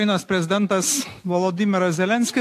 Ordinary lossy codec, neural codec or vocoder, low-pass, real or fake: AAC, 64 kbps; none; 14.4 kHz; real